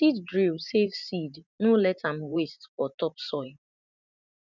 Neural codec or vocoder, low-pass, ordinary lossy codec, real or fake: vocoder, 44.1 kHz, 128 mel bands every 512 samples, BigVGAN v2; 7.2 kHz; none; fake